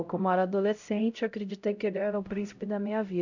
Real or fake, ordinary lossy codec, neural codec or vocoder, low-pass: fake; none; codec, 16 kHz, 0.5 kbps, X-Codec, HuBERT features, trained on LibriSpeech; 7.2 kHz